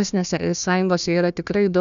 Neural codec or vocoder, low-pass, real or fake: codec, 16 kHz, 1 kbps, FunCodec, trained on Chinese and English, 50 frames a second; 7.2 kHz; fake